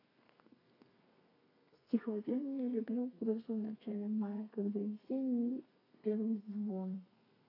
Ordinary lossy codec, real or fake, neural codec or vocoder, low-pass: AAC, 48 kbps; fake; codec, 32 kHz, 1.9 kbps, SNAC; 5.4 kHz